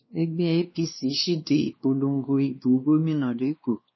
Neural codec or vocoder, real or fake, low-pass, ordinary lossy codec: codec, 16 kHz, 2 kbps, X-Codec, WavLM features, trained on Multilingual LibriSpeech; fake; 7.2 kHz; MP3, 24 kbps